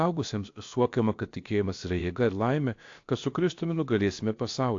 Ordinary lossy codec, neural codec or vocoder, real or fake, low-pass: AAC, 48 kbps; codec, 16 kHz, about 1 kbps, DyCAST, with the encoder's durations; fake; 7.2 kHz